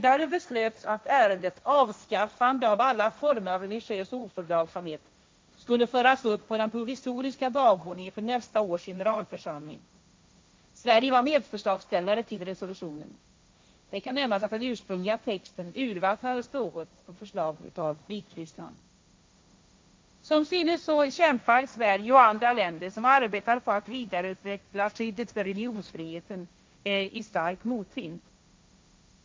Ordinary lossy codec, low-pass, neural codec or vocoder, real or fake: none; none; codec, 16 kHz, 1.1 kbps, Voila-Tokenizer; fake